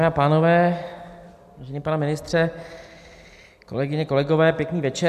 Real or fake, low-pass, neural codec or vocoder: real; 14.4 kHz; none